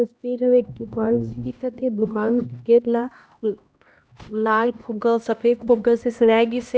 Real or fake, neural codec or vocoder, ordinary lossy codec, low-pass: fake; codec, 16 kHz, 1 kbps, X-Codec, HuBERT features, trained on LibriSpeech; none; none